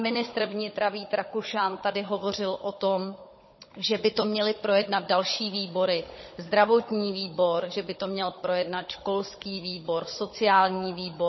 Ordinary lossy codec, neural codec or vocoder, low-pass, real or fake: MP3, 24 kbps; codec, 16 kHz, 16 kbps, FunCodec, trained on Chinese and English, 50 frames a second; 7.2 kHz; fake